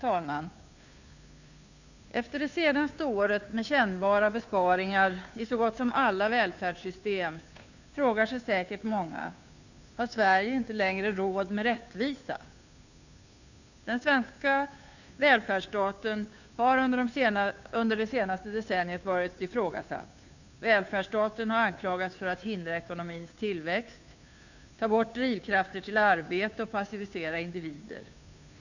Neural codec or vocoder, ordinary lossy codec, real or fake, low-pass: codec, 16 kHz, 2 kbps, FunCodec, trained on Chinese and English, 25 frames a second; none; fake; 7.2 kHz